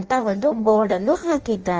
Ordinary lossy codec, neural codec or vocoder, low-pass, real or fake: Opus, 24 kbps; codec, 16 kHz in and 24 kHz out, 0.6 kbps, FireRedTTS-2 codec; 7.2 kHz; fake